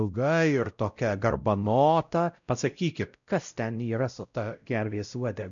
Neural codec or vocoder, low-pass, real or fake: codec, 16 kHz, 0.5 kbps, X-Codec, WavLM features, trained on Multilingual LibriSpeech; 7.2 kHz; fake